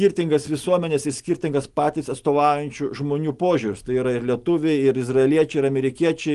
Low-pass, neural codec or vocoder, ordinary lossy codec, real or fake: 10.8 kHz; none; Opus, 24 kbps; real